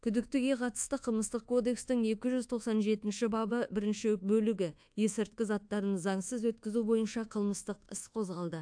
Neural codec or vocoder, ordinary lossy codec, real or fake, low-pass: codec, 24 kHz, 1.2 kbps, DualCodec; AAC, 64 kbps; fake; 9.9 kHz